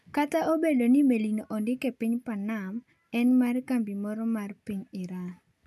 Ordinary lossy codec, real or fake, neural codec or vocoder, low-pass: none; real; none; 14.4 kHz